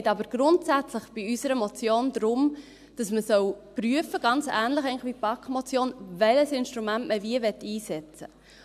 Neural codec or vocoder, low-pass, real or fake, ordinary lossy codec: none; 14.4 kHz; real; AAC, 96 kbps